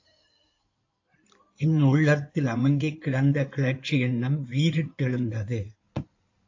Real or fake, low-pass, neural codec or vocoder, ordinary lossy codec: fake; 7.2 kHz; codec, 16 kHz in and 24 kHz out, 2.2 kbps, FireRedTTS-2 codec; AAC, 48 kbps